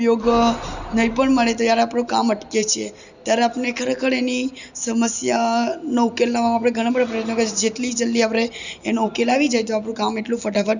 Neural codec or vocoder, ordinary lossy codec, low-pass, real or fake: none; none; 7.2 kHz; real